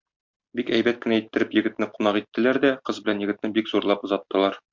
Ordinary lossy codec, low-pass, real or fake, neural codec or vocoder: MP3, 64 kbps; 7.2 kHz; real; none